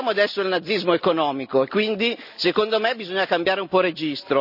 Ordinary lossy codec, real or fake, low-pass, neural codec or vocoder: none; real; 5.4 kHz; none